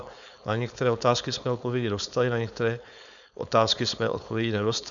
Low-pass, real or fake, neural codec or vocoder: 7.2 kHz; fake; codec, 16 kHz, 4.8 kbps, FACodec